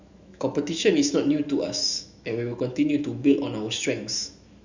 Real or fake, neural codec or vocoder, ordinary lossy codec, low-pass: fake; autoencoder, 48 kHz, 128 numbers a frame, DAC-VAE, trained on Japanese speech; Opus, 64 kbps; 7.2 kHz